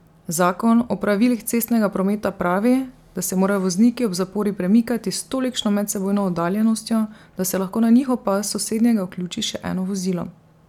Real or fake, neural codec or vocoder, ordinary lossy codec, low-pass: real; none; none; 19.8 kHz